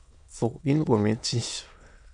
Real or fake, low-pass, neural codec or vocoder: fake; 9.9 kHz; autoencoder, 22.05 kHz, a latent of 192 numbers a frame, VITS, trained on many speakers